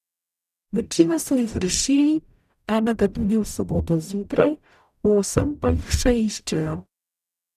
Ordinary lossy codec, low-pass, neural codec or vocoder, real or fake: none; 14.4 kHz; codec, 44.1 kHz, 0.9 kbps, DAC; fake